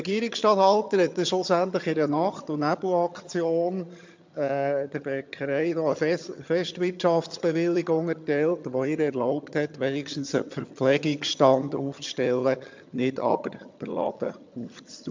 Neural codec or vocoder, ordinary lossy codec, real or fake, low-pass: vocoder, 22.05 kHz, 80 mel bands, HiFi-GAN; MP3, 64 kbps; fake; 7.2 kHz